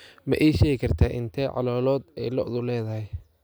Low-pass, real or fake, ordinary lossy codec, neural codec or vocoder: none; real; none; none